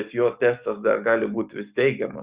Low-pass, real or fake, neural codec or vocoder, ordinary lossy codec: 3.6 kHz; fake; codec, 44.1 kHz, 7.8 kbps, DAC; Opus, 64 kbps